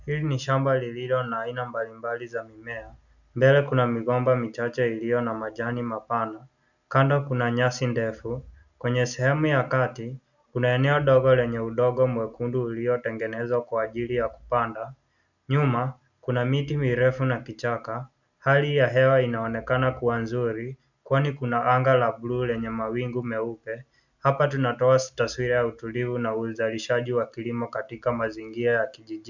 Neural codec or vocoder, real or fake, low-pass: none; real; 7.2 kHz